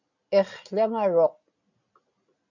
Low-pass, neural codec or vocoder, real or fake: 7.2 kHz; none; real